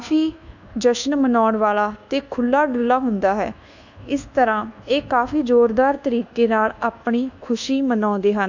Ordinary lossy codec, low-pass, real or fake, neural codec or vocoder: none; 7.2 kHz; fake; codec, 24 kHz, 1.2 kbps, DualCodec